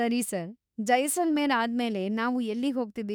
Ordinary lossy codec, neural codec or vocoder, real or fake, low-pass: none; autoencoder, 48 kHz, 32 numbers a frame, DAC-VAE, trained on Japanese speech; fake; none